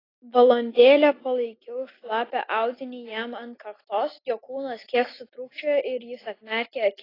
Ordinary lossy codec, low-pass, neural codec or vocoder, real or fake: AAC, 24 kbps; 5.4 kHz; none; real